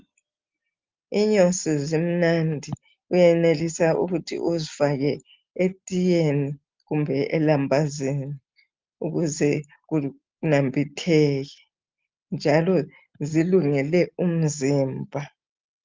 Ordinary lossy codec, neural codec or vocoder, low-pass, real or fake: Opus, 32 kbps; none; 7.2 kHz; real